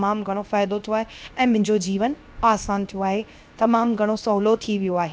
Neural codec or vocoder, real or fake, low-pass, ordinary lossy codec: codec, 16 kHz, 0.7 kbps, FocalCodec; fake; none; none